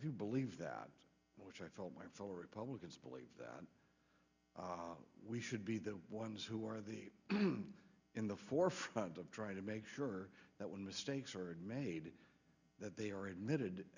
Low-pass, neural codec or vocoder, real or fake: 7.2 kHz; none; real